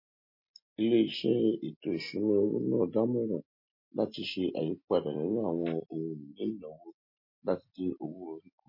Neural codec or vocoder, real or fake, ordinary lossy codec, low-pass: none; real; MP3, 24 kbps; 5.4 kHz